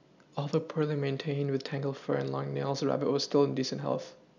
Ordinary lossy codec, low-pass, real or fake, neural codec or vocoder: none; 7.2 kHz; real; none